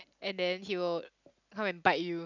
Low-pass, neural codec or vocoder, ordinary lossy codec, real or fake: 7.2 kHz; none; none; real